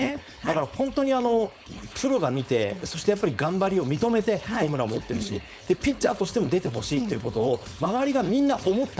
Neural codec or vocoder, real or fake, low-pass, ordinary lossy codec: codec, 16 kHz, 4.8 kbps, FACodec; fake; none; none